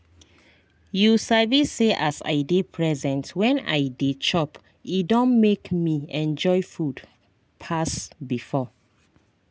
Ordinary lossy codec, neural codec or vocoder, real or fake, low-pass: none; none; real; none